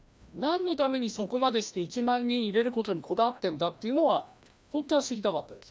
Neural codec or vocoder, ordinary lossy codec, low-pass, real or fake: codec, 16 kHz, 1 kbps, FreqCodec, larger model; none; none; fake